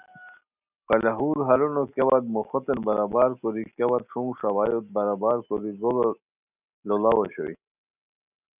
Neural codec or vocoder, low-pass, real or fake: none; 3.6 kHz; real